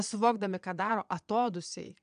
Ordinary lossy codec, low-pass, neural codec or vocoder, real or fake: MP3, 96 kbps; 9.9 kHz; vocoder, 22.05 kHz, 80 mel bands, Vocos; fake